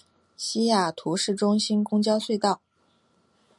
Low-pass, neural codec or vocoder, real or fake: 10.8 kHz; none; real